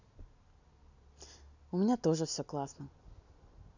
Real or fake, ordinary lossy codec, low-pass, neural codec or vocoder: real; none; 7.2 kHz; none